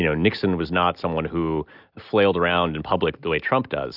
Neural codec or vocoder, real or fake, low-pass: none; real; 5.4 kHz